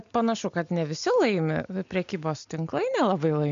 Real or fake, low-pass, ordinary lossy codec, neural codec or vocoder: real; 7.2 kHz; MP3, 64 kbps; none